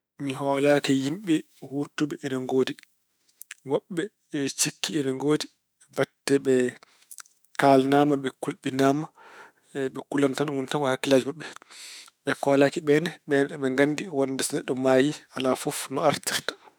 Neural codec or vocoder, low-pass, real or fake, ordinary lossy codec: autoencoder, 48 kHz, 32 numbers a frame, DAC-VAE, trained on Japanese speech; none; fake; none